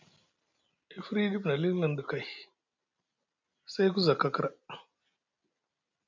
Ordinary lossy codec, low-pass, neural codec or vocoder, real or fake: MP3, 48 kbps; 7.2 kHz; none; real